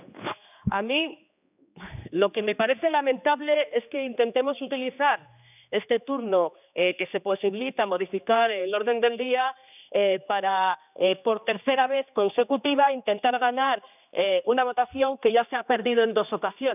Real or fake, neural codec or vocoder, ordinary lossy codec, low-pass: fake; codec, 16 kHz, 2 kbps, X-Codec, HuBERT features, trained on general audio; none; 3.6 kHz